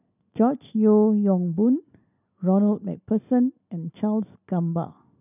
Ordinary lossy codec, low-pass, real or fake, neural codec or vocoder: none; 3.6 kHz; real; none